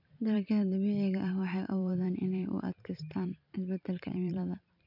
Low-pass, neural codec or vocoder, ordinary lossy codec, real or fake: 5.4 kHz; vocoder, 44.1 kHz, 128 mel bands every 512 samples, BigVGAN v2; none; fake